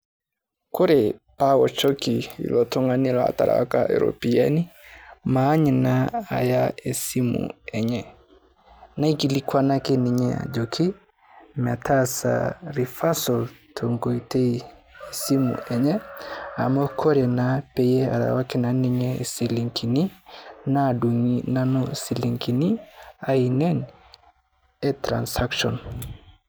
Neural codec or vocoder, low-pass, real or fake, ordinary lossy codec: none; none; real; none